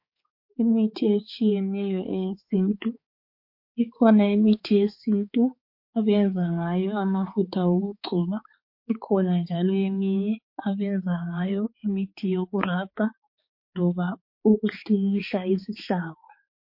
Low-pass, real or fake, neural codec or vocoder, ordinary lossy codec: 5.4 kHz; fake; codec, 16 kHz, 4 kbps, X-Codec, HuBERT features, trained on balanced general audio; MP3, 32 kbps